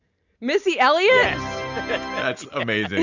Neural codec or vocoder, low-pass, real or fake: none; 7.2 kHz; real